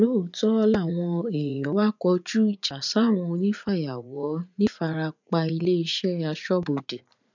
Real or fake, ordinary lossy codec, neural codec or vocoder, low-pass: fake; none; vocoder, 44.1 kHz, 80 mel bands, Vocos; 7.2 kHz